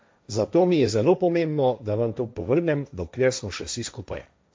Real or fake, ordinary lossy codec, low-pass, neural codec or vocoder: fake; none; none; codec, 16 kHz, 1.1 kbps, Voila-Tokenizer